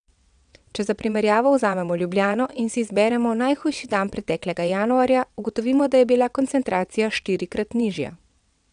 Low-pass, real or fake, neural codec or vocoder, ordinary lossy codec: 9.9 kHz; fake; vocoder, 22.05 kHz, 80 mel bands, WaveNeXt; none